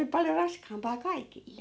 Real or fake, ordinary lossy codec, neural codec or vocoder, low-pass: real; none; none; none